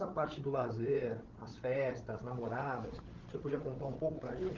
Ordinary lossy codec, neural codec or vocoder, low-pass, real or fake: Opus, 16 kbps; codec, 16 kHz, 8 kbps, FreqCodec, larger model; 7.2 kHz; fake